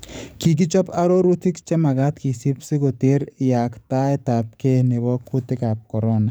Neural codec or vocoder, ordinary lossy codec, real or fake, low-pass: codec, 44.1 kHz, 7.8 kbps, DAC; none; fake; none